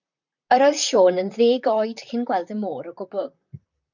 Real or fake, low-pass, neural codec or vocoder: fake; 7.2 kHz; vocoder, 44.1 kHz, 128 mel bands, Pupu-Vocoder